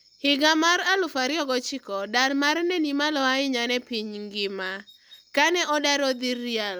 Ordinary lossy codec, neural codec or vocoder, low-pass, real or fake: none; none; none; real